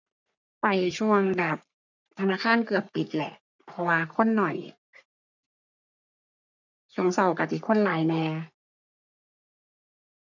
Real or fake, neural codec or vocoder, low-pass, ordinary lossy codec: fake; codec, 44.1 kHz, 3.4 kbps, Pupu-Codec; 7.2 kHz; none